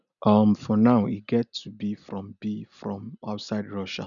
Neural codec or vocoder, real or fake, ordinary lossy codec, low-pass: none; real; none; 7.2 kHz